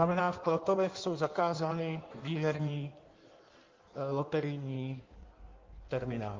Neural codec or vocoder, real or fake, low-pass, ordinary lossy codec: codec, 16 kHz in and 24 kHz out, 1.1 kbps, FireRedTTS-2 codec; fake; 7.2 kHz; Opus, 32 kbps